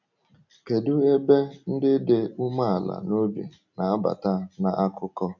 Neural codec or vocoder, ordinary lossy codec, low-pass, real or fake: none; none; 7.2 kHz; real